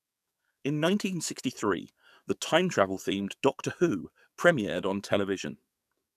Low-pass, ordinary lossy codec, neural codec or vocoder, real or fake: 14.4 kHz; none; codec, 44.1 kHz, 7.8 kbps, DAC; fake